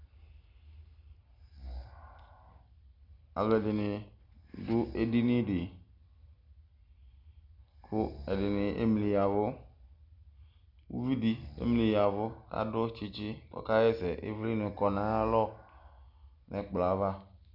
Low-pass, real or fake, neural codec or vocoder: 5.4 kHz; real; none